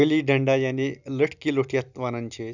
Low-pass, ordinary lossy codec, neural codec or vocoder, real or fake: 7.2 kHz; none; none; real